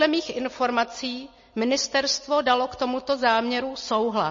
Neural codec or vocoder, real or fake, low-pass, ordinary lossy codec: none; real; 7.2 kHz; MP3, 32 kbps